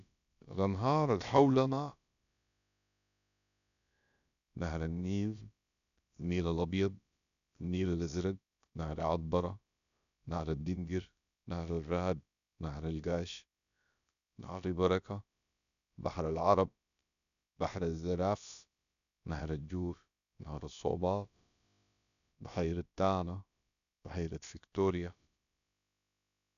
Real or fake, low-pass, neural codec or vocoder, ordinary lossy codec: fake; 7.2 kHz; codec, 16 kHz, about 1 kbps, DyCAST, with the encoder's durations; none